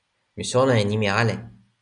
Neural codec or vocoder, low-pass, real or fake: none; 9.9 kHz; real